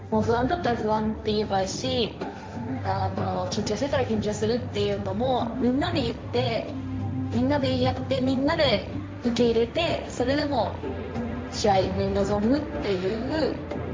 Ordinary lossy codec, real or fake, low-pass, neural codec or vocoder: none; fake; none; codec, 16 kHz, 1.1 kbps, Voila-Tokenizer